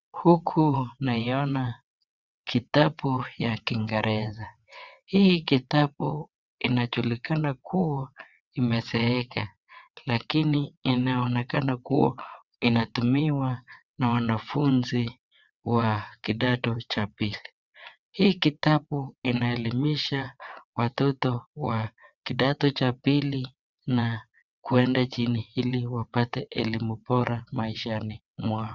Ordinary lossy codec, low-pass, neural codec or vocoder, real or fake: Opus, 64 kbps; 7.2 kHz; vocoder, 22.05 kHz, 80 mel bands, WaveNeXt; fake